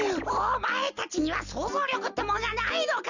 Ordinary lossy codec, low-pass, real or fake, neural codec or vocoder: none; 7.2 kHz; real; none